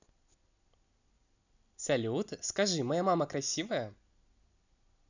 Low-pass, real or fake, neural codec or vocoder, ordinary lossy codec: 7.2 kHz; real; none; none